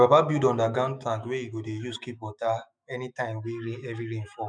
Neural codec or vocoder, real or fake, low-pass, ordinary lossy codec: autoencoder, 48 kHz, 128 numbers a frame, DAC-VAE, trained on Japanese speech; fake; 9.9 kHz; none